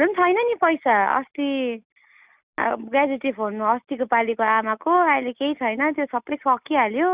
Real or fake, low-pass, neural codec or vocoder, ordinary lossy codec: real; 3.6 kHz; none; Opus, 64 kbps